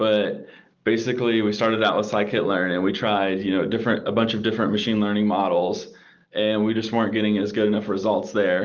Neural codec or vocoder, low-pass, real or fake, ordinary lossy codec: none; 7.2 kHz; real; Opus, 24 kbps